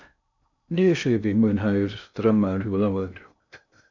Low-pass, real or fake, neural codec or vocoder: 7.2 kHz; fake; codec, 16 kHz in and 24 kHz out, 0.6 kbps, FocalCodec, streaming, 2048 codes